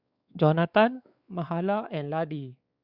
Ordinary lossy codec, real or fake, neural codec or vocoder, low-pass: Opus, 64 kbps; fake; codec, 16 kHz, 4 kbps, X-Codec, WavLM features, trained on Multilingual LibriSpeech; 5.4 kHz